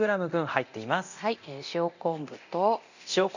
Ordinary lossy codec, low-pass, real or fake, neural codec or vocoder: none; 7.2 kHz; fake; codec, 24 kHz, 0.9 kbps, DualCodec